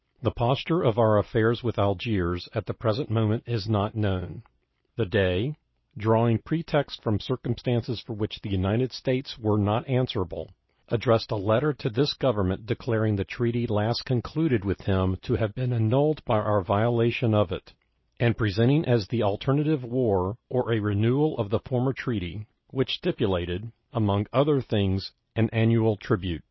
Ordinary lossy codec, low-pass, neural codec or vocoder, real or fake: MP3, 24 kbps; 7.2 kHz; none; real